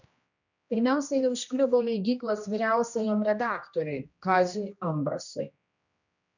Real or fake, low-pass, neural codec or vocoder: fake; 7.2 kHz; codec, 16 kHz, 1 kbps, X-Codec, HuBERT features, trained on general audio